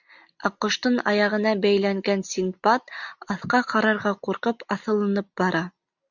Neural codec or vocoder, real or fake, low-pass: none; real; 7.2 kHz